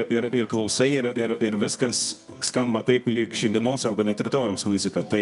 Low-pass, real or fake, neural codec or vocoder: 10.8 kHz; fake; codec, 24 kHz, 0.9 kbps, WavTokenizer, medium music audio release